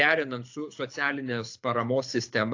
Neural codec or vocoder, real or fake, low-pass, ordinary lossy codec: codec, 24 kHz, 6 kbps, HILCodec; fake; 7.2 kHz; AAC, 48 kbps